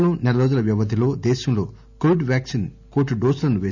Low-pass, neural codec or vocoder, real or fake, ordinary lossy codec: 7.2 kHz; none; real; none